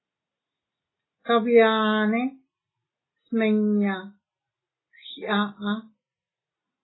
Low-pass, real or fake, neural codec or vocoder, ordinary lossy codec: 7.2 kHz; real; none; AAC, 16 kbps